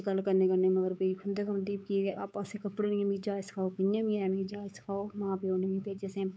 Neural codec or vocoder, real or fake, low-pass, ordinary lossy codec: codec, 16 kHz, 4 kbps, X-Codec, WavLM features, trained on Multilingual LibriSpeech; fake; none; none